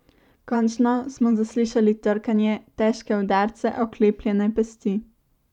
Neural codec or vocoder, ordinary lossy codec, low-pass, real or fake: vocoder, 44.1 kHz, 128 mel bands every 512 samples, BigVGAN v2; none; 19.8 kHz; fake